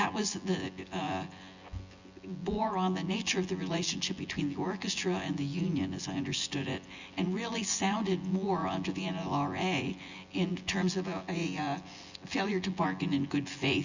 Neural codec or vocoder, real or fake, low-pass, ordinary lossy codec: vocoder, 24 kHz, 100 mel bands, Vocos; fake; 7.2 kHz; Opus, 64 kbps